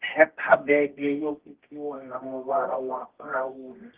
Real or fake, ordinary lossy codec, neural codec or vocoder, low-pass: fake; Opus, 16 kbps; codec, 24 kHz, 0.9 kbps, WavTokenizer, medium music audio release; 3.6 kHz